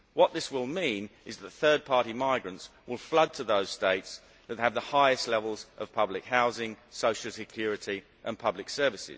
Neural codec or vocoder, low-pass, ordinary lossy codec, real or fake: none; none; none; real